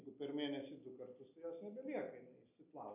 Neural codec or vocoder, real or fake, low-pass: none; real; 3.6 kHz